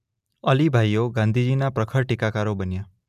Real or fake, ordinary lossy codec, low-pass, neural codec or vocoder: real; none; 14.4 kHz; none